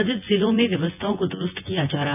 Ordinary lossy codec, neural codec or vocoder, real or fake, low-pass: none; vocoder, 24 kHz, 100 mel bands, Vocos; fake; 3.6 kHz